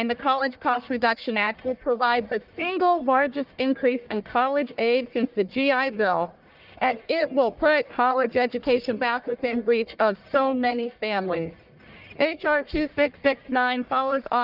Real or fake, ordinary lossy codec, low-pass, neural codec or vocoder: fake; Opus, 24 kbps; 5.4 kHz; codec, 44.1 kHz, 1.7 kbps, Pupu-Codec